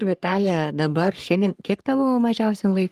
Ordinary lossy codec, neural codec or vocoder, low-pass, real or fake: Opus, 24 kbps; codec, 44.1 kHz, 3.4 kbps, Pupu-Codec; 14.4 kHz; fake